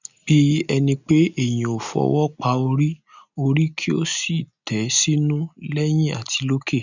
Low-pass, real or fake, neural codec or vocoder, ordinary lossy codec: 7.2 kHz; real; none; none